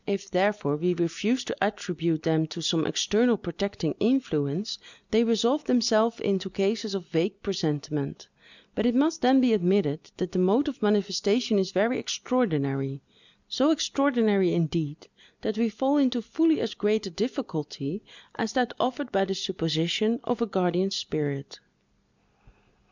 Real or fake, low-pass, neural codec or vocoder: real; 7.2 kHz; none